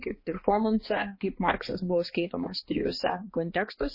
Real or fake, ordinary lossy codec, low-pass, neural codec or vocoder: fake; MP3, 24 kbps; 5.4 kHz; codec, 16 kHz, 2 kbps, X-Codec, HuBERT features, trained on balanced general audio